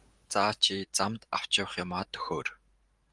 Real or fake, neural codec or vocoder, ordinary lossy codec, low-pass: fake; vocoder, 44.1 kHz, 128 mel bands every 512 samples, BigVGAN v2; Opus, 24 kbps; 10.8 kHz